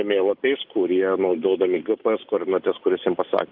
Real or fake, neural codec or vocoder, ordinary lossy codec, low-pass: real; none; Opus, 24 kbps; 5.4 kHz